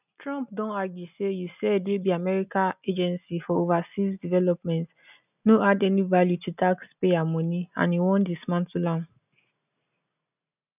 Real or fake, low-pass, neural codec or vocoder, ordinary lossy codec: real; 3.6 kHz; none; none